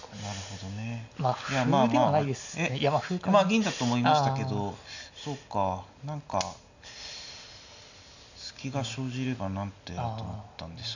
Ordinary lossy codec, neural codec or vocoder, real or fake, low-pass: none; none; real; 7.2 kHz